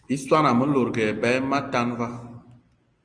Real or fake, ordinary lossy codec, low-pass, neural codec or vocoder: real; Opus, 32 kbps; 9.9 kHz; none